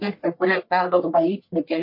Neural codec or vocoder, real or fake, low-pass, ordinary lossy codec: codec, 44.1 kHz, 1.7 kbps, Pupu-Codec; fake; 5.4 kHz; MP3, 32 kbps